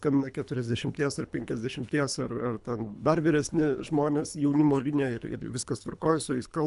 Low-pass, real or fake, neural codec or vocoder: 10.8 kHz; fake; codec, 24 kHz, 3 kbps, HILCodec